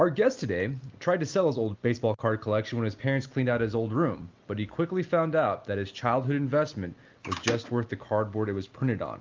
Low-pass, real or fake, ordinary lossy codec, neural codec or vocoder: 7.2 kHz; real; Opus, 24 kbps; none